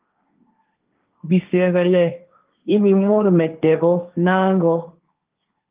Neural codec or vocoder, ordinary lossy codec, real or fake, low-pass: codec, 16 kHz, 1.1 kbps, Voila-Tokenizer; Opus, 32 kbps; fake; 3.6 kHz